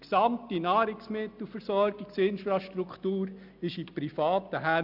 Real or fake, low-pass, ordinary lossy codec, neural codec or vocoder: real; 5.4 kHz; none; none